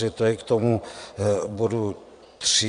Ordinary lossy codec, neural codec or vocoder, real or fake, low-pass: MP3, 64 kbps; vocoder, 22.05 kHz, 80 mel bands, Vocos; fake; 9.9 kHz